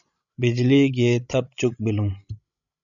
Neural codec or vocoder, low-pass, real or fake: codec, 16 kHz, 16 kbps, FreqCodec, larger model; 7.2 kHz; fake